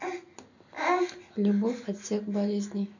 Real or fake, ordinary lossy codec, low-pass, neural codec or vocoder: real; none; 7.2 kHz; none